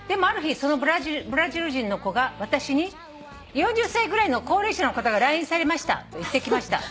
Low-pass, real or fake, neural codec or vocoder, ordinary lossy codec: none; real; none; none